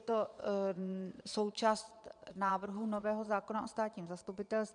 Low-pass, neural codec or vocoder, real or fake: 9.9 kHz; vocoder, 22.05 kHz, 80 mel bands, Vocos; fake